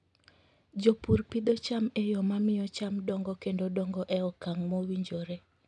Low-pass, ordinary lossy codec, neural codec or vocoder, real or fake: 10.8 kHz; none; none; real